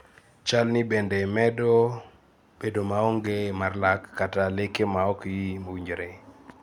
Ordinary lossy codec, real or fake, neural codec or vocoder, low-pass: none; real; none; 19.8 kHz